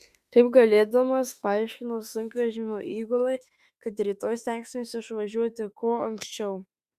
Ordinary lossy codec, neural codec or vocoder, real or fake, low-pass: Opus, 64 kbps; autoencoder, 48 kHz, 32 numbers a frame, DAC-VAE, trained on Japanese speech; fake; 14.4 kHz